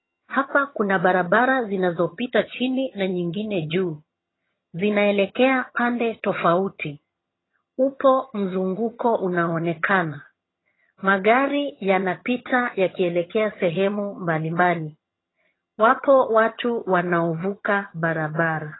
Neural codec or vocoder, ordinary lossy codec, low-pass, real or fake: vocoder, 22.05 kHz, 80 mel bands, HiFi-GAN; AAC, 16 kbps; 7.2 kHz; fake